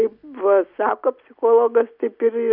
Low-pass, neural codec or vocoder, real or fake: 5.4 kHz; none; real